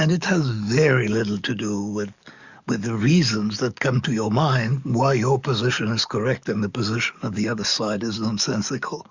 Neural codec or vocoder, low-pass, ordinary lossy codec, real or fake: codec, 44.1 kHz, 7.8 kbps, DAC; 7.2 kHz; Opus, 64 kbps; fake